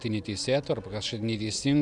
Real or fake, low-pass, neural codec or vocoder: real; 10.8 kHz; none